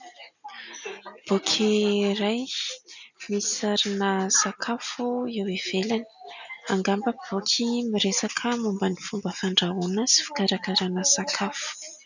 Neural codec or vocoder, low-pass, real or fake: none; 7.2 kHz; real